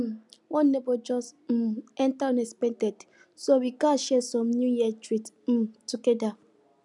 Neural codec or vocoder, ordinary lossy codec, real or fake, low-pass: none; none; real; 10.8 kHz